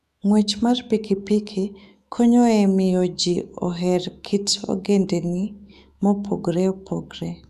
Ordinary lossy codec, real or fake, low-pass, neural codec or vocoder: none; fake; 14.4 kHz; autoencoder, 48 kHz, 128 numbers a frame, DAC-VAE, trained on Japanese speech